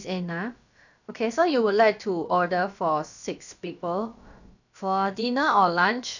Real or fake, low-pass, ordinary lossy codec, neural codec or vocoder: fake; 7.2 kHz; none; codec, 16 kHz, about 1 kbps, DyCAST, with the encoder's durations